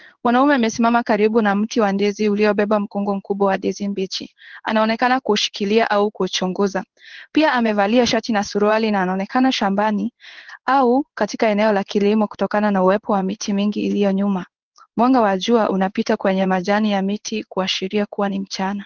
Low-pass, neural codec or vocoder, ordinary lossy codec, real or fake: 7.2 kHz; codec, 16 kHz in and 24 kHz out, 1 kbps, XY-Tokenizer; Opus, 16 kbps; fake